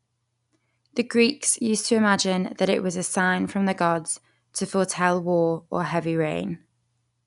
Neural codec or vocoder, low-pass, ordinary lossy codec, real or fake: none; 10.8 kHz; none; real